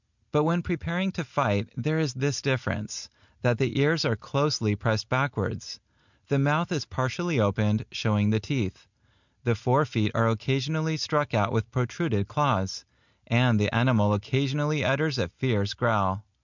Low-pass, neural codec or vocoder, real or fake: 7.2 kHz; none; real